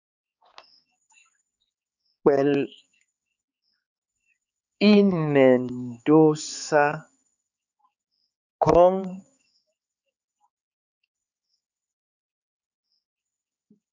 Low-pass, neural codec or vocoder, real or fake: 7.2 kHz; codec, 16 kHz, 4 kbps, X-Codec, HuBERT features, trained on balanced general audio; fake